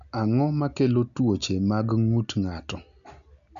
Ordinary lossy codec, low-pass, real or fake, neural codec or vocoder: none; 7.2 kHz; real; none